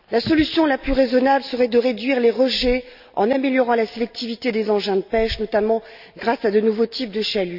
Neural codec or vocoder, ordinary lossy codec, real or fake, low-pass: none; none; real; 5.4 kHz